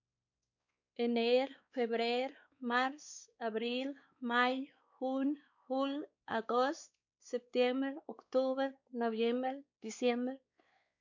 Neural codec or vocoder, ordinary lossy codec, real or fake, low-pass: codec, 16 kHz, 4 kbps, X-Codec, WavLM features, trained on Multilingual LibriSpeech; MP3, 64 kbps; fake; 7.2 kHz